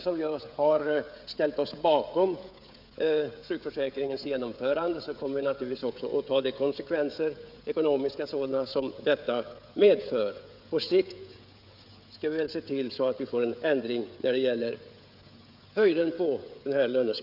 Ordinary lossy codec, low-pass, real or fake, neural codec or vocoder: none; 5.4 kHz; fake; codec, 16 kHz, 16 kbps, FreqCodec, smaller model